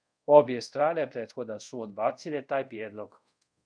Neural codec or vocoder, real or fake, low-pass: codec, 24 kHz, 0.5 kbps, DualCodec; fake; 9.9 kHz